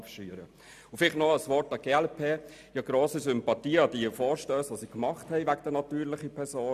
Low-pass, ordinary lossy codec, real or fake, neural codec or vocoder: 14.4 kHz; AAC, 96 kbps; real; none